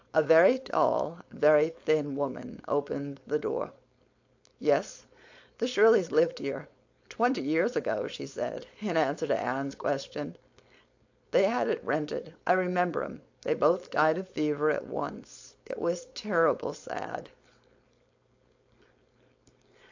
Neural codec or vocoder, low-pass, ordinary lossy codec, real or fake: codec, 16 kHz, 4.8 kbps, FACodec; 7.2 kHz; AAC, 48 kbps; fake